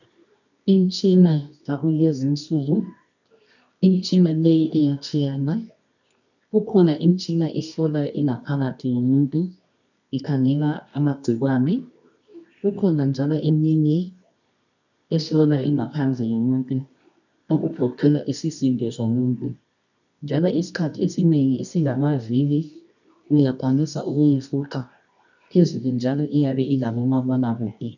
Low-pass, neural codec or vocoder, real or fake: 7.2 kHz; codec, 24 kHz, 0.9 kbps, WavTokenizer, medium music audio release; fake